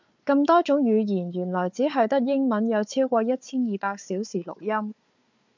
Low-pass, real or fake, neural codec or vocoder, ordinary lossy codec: 7.2 kHz; fake; codec, 16 kHz, 4 kbps, FunCodec, trained on Chinese and English, 50 frames a second; MP3, 64 kbps